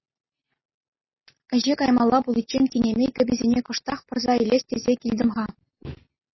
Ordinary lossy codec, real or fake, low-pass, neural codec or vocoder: MP3, 24 kbps; real; 7.2 kHz; none